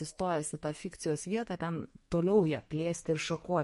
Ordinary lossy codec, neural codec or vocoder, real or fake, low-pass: MP3, 48 kbps; codec, 44.1 kHz, 2.6 kbps, SNAC; fake; 14.4 kHz